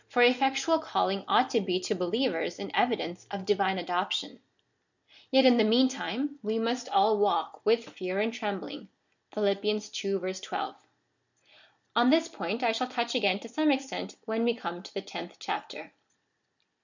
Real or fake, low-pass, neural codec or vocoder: real; 7.2 kHz; none